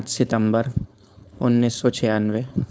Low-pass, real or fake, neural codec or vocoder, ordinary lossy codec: none; fake; codec, 16 kHz, 4.8 kbps, FACodec; none